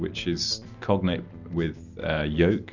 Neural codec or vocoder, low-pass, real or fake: none; 7.2 kHz; real